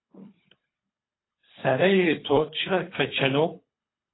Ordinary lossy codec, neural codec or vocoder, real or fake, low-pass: AAC, 16 kbps; codec, 24 kHz, 3 kbps, HILCodec; fake; 7.2 kHz